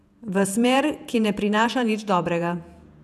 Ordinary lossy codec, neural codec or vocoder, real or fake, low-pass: none; vocoder, 48 kHz, 128 mel bands, Vocos; fake; 14.4 kHz